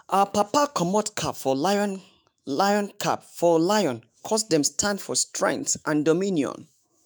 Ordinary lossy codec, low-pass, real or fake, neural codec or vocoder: none; none; fake; autoencoder, 48 kHz, 128 numbers a frame, DAC-VAE, trained on Japanese speech